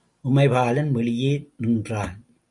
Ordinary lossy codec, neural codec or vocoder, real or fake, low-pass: MP3, 64 kbps; none; real; 10.8 kHz